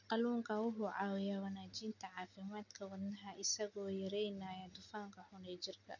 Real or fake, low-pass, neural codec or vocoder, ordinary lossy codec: real; 7.2 kHz; none; none